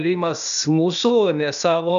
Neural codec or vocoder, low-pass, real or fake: codec, 16 kHz, 0.8 kbps, ZipCodec; 7.2 kHz; fake